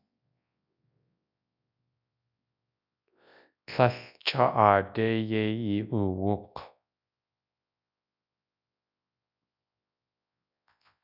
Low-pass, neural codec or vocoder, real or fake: 5.4 kHz; codec, 24 kHz, 0.9 kbps, WavTokenizer, large speech release; fake